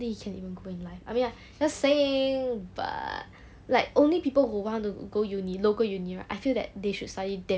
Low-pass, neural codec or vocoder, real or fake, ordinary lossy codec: none; none; real; none